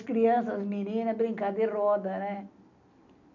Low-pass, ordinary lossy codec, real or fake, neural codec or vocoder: 7.2 kHz; none; real; none